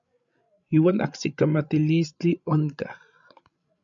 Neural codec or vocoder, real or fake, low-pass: codec, 16 kHz, 16 kbps, FreqCodec, larger model; fake; 7.2 kHz